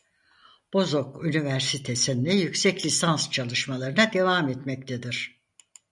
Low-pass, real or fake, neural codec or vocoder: 10.8 kHz; real; none